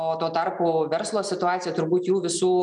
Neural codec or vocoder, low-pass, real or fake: none; 9.9 kHz; real